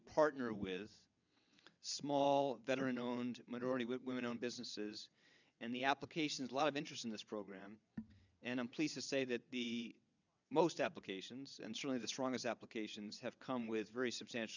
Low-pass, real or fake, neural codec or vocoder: 7.2 kHz; fake; vocoder, 22.05 kHz, 80 mel bands, WaveNeXt